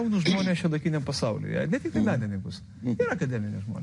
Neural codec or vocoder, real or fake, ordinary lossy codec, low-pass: none; real; MP3, 48 kbps; 10.8 kHz